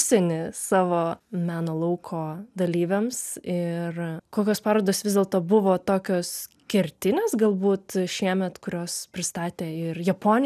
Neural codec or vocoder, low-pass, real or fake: none; 14.4 kHz; real